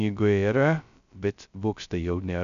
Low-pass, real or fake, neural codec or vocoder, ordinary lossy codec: 7.2 kHz; fake; codec, 16 kHz, 0.2 kbps, FocalCodec; MP3, 96 kbps